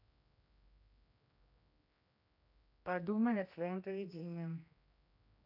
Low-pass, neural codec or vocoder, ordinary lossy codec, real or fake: 5.4 kHz; codec, 16 kHz, 1 kbps, X-Codec, HuBERT features, trained on general audio; AAC, 48 kbps; fake